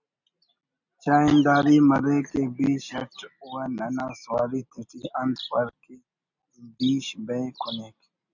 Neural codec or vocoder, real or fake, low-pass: none; real; 7.2 kHz